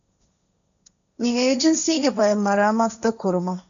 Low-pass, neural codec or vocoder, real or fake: 7.2 kHz; codec, 16 kHz, 1.1 kbps, Voila-Tokenizer; fake